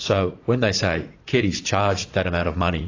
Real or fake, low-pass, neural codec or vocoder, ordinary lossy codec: fake; 7.2 kHz; vocoder, 44.1 kHz, 80 mel bands, Vocos; AAC, 32 kbps